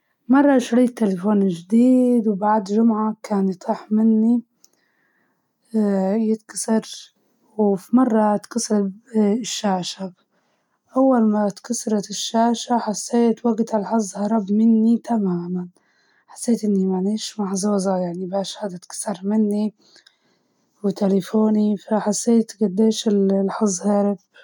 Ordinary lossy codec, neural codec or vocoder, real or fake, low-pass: none; none; real; 19.8 kHz